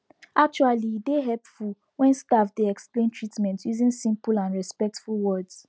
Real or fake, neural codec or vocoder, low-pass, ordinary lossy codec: real; none; none; none